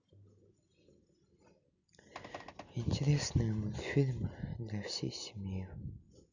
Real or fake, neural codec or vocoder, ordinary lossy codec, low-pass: real; none; none; 7.2 kHz